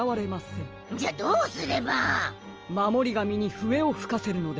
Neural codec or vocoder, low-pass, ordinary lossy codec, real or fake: none; 7.2 kHz; Opus, 24 kbps; real